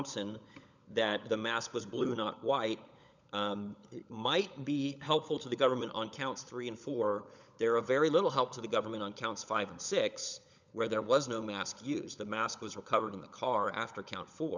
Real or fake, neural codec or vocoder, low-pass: fake; codec, 16 kHz, 16 kbps, FunCodec, trained on LibriTTS, 50 frames a second; 7.2 kHz